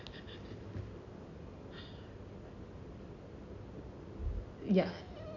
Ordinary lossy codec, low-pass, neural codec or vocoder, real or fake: none; 7.2 kHz; none; real